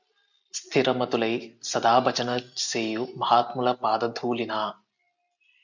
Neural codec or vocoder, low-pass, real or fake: none; 7.2 kHz; real